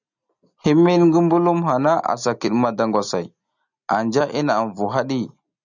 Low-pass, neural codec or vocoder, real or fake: 7.2 kHz; none; real